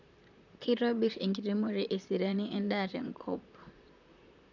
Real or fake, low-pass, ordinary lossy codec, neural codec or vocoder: fake; 7.2 kHz; none; vocoder, 44.1 kHz, 128 mel bands, Pupu-Vocoder